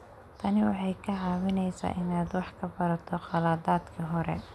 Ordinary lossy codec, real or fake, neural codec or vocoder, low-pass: none; real; none; none